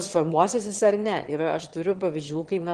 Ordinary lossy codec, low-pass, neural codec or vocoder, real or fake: Opus, 16 kbps; 9.9 kHz; autoencoder, 22.05 kHz, a latent of 192 numbers a frame, VITS, trained on one speaker; fake